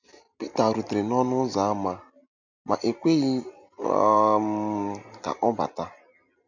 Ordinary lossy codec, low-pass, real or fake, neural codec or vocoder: none; 7.2 kHz; real; none